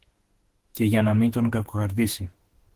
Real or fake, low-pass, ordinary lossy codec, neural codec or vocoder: fake; 14.4 kHz; Opus, 16 kbps; autoencoder, 48 kHz, 32 numbers a frame, DAC-VAE, trained on Japanese speech